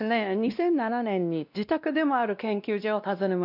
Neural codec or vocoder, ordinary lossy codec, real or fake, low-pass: codec, 16 kHz, 1 kbps, X-Codec, WavLM features, trained on Multilingual LibriSpeech; none; fake; 5.4 kHz